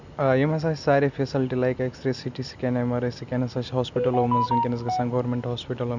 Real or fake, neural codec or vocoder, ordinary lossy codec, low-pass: real; none; none; 7.2 kHz